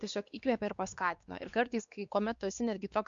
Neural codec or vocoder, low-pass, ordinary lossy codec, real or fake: codec, 16 kHz, 2 kbps, X-Codec, WavLM features, trained on Multilingual LibriSpeech; 7.2 kHz; Opus, 64 kbps; fake